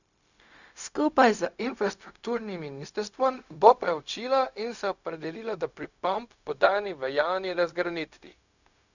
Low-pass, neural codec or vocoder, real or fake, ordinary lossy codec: 7.2 kHz; codec, 16 kHz, 0.4 kbps, LongCat-Audio-Codec; fake; none